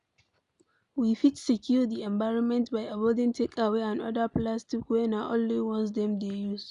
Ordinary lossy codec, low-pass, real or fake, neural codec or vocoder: none; 10.8 kHz; real; none